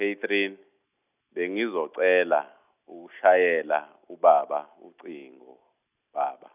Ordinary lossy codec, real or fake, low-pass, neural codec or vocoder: none; real; 3.6 kHz; none